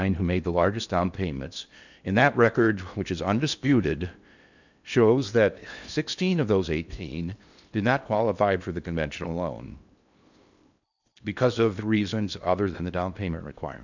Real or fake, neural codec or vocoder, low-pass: fake; codec, 16 kHz in and 24 kHz out, 0.8 kbps, FocalCodec, streaming, 65536 codes; 7.2 kHz